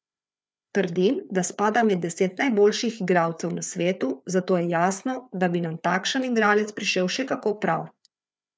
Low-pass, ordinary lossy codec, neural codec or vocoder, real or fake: none; none; codec, 16 kHz, 4 kbps, FreqCodec, larger model; fake